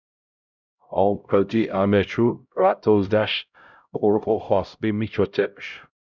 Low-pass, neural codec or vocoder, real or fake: 7.2 kHz; codec, 16 kHz, 0.5 kbps, X-Codec, HuBERT features, trained on LibriSpeech; fake